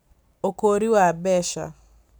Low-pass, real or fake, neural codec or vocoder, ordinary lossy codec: none; real; none; none